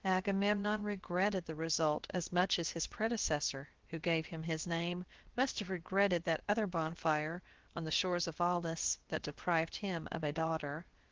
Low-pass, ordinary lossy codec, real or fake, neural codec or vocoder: 7.2 kHz; Opus, 16 kbps; fake; codec, 16 kHz, about 1 kbps, DyCAST, with the encoder's durations